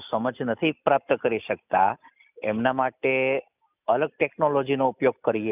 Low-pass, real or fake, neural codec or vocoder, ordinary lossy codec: 3.6 kHz; real; none; none